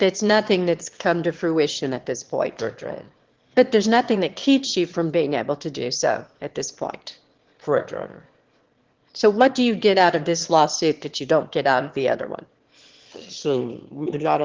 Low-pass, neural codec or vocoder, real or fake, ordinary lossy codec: 7.2 kHz; autoencoder, 22.05 kHz, a latent of 192 numbers a frame, VITS, trained on one speaker; fake; Opus, 16 kbps